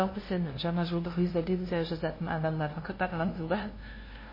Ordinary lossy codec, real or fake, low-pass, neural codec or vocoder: MP3, 24 kbps; fake; 5.4 kHz; codec, 16 kHz, 0.5 kbps, FunCodec, trained on LibriTTS, 25 frames a second